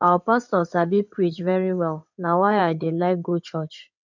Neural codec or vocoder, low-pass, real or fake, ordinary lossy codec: codec, 16 kHz in and 24 kHz out, 2.2 kbps, FireRedTTS-2 codec; 7.2 kHz; fake; none